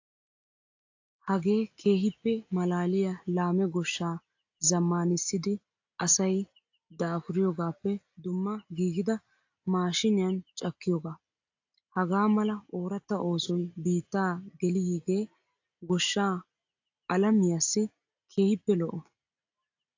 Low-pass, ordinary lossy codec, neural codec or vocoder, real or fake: 7.2 kHz; AAC, 48 kbps; none; real